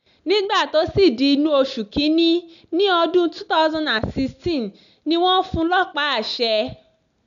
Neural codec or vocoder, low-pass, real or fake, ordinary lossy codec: none; 7.2 kHz; real; none